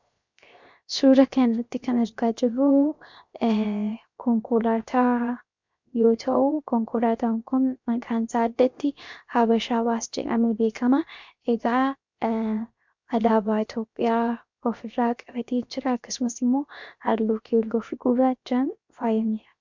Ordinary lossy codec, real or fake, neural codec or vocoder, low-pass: MP3, 64 kbps; fake; codec, 16 kHz, 0.7 kbps, FocalCodec; 7.2 kHz